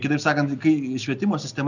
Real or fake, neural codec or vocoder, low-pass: real; none; 7.2 kHz